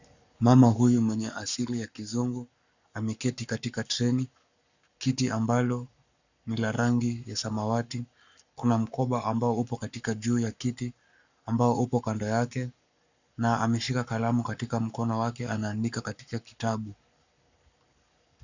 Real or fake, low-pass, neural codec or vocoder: fake; 7.2 kHz; codec, 44.1 kHz, 7.8 kbps, Pupu-Codec